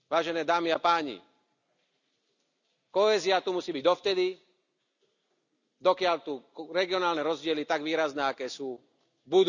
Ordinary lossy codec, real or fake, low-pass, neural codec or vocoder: none; real; 7.2 kHz; none